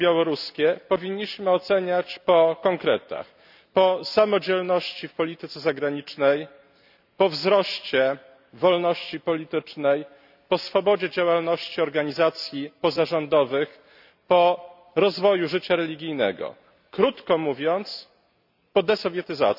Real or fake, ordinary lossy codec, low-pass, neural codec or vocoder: real; none; 5.4 kHz; none